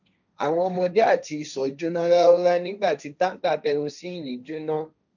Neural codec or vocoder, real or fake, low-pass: codec, 16 kHz, 1.1 kbps, Voila-Tokenizer; fake; 7.2 kHz